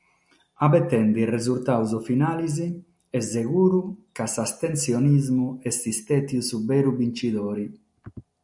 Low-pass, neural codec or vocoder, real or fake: 10.8 kHz; none; real